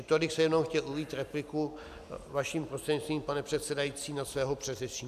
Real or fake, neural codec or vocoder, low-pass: real; none; 14.4 kHz